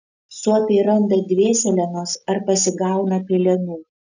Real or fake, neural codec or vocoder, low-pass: real; none; 7.2 kHz